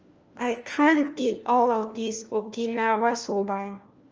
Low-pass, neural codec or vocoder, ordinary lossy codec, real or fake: 7.2 kHz; codec, 16 kHz, 1 kbps, FunCodec, trained on LibriTTS, 50 frames a second; Opus, 24 kbps; fake